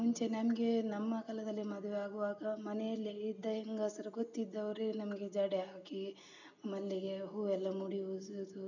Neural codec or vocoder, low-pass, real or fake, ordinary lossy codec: none; 7.2 kHz; real; none